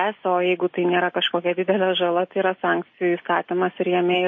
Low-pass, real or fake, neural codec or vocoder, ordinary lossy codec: 7.2 kHz; real; none; MP3, 32 kbps